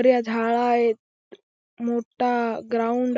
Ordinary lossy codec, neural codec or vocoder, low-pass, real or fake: none; none; none; real